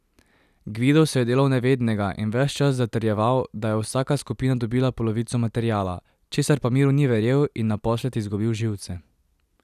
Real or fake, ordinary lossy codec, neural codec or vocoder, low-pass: real; none; none; 14.4 kHz